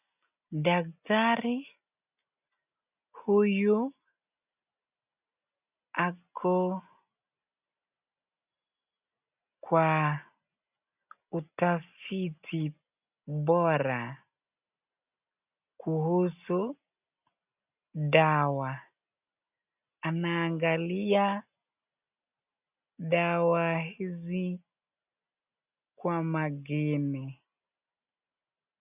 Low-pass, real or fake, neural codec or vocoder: 3.6 kHz; real; none